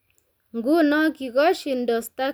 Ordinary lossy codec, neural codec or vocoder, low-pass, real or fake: none; none; none; real